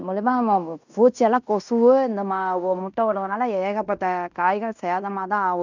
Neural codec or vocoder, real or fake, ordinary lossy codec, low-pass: codec, 16 kHz in and 24 kHz out, 0.9 kbps, LongCat-Audio-Codec, fine tuned four codebook decoder; fake; none; 7.2 kHz